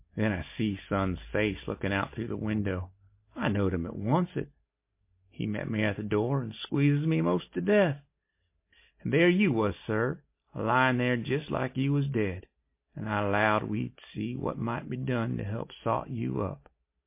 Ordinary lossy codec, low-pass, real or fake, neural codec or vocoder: MP3, 24 kbps; 3.6 kHz; real; none